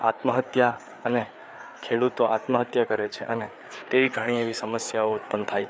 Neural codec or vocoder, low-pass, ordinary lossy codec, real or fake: codec, 16 kHz, 4 kbps, FreqCodec, larger model; none; none; fake